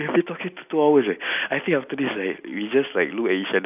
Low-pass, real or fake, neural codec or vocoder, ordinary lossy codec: 3.6 kHz; real; none; none